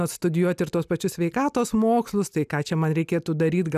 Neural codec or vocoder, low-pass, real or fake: none; 14.4 kHz; real